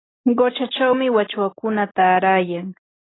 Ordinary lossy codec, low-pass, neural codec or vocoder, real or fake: AAC, 16 kbps; 7.2 kHz; none; real